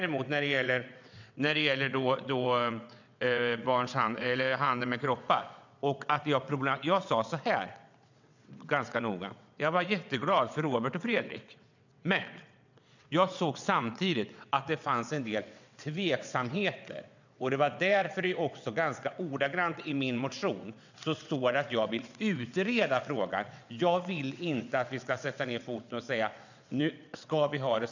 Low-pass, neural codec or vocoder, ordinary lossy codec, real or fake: 7.2 kHz; vocoder, 22.05 kHz, 80 mel bands, WaveNeXt; none; fake